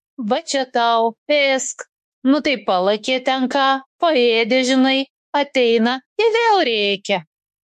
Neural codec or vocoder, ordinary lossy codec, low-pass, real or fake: autoencoder, 48 kHz, 32 numbers a frame, DAC-VAE, trained on Japanese speech; MP3, 64 kbps; 14.4 kHz; fake